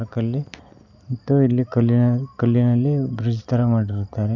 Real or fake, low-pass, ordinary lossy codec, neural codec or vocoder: real; 7.2 kHz; none; none